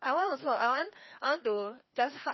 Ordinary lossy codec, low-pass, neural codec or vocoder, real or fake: MP3, 24 kbps; 7.2 kHz; codec, 16 kHz, 2 kbps, FreqCodec, larger model; fake